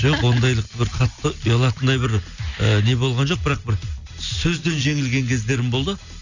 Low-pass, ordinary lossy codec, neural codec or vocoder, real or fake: 7.2 kHz; none; none; real